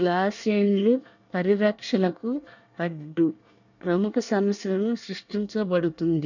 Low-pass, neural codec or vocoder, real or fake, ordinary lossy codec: 7.2 kHz; codec, 24 kHz, 1 kbps, SNAC; fake; none